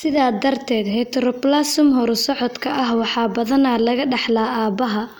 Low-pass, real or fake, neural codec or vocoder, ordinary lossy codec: 19.8 kHz; real; none; none